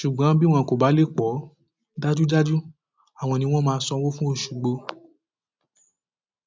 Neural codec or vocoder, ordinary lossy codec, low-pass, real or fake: none; none; none; real